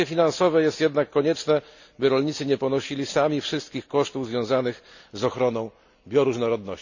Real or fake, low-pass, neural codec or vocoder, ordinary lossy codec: real; 7.2 kHz; none; none